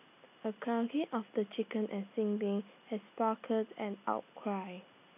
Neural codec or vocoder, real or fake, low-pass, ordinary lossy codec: none; real; 3.6 kHz; none